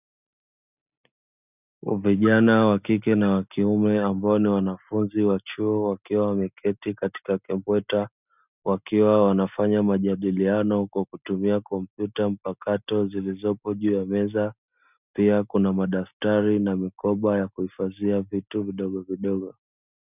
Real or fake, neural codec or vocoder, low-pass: real; none; 3.6 kHz